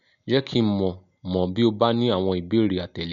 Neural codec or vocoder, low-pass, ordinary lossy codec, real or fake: none; 7.2 kHz; none; real